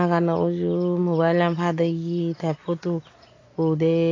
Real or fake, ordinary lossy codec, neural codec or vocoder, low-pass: real; AAC, 32 kbps; none; 7.2 kHz